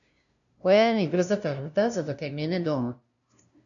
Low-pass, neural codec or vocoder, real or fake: 7.2 kHz; codec, 16 kHz, 0.5 kbps, FunCodec, trained on LibriTTS, 25 frames a second; fake